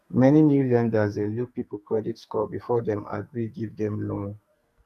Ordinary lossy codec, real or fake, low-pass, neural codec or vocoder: MP3, 96 kbps; fake; 14.4 kHz; codec, 44.1 kHz, 2.6 kbps, SNAC